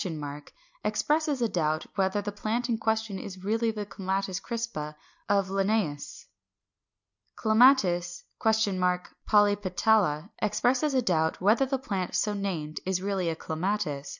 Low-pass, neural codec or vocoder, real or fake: 7.2 kHz; none; real